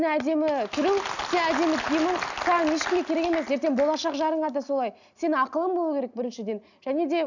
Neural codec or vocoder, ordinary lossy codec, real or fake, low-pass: none; none; real; 7.2 kHz